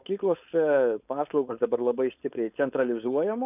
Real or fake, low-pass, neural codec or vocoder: fake; 3.6 kHz; codec, 16 kHz, 4.8 kbps, FACodec